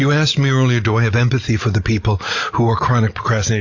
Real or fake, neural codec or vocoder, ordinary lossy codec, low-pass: real; none; AAC, 48 kbps; 7.2 kHz